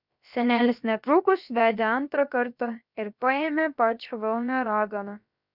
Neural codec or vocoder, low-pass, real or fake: codec, 16 kHz, about 1 kbps, DyCAST, with the encoder's durations; 5.4 kHz; fake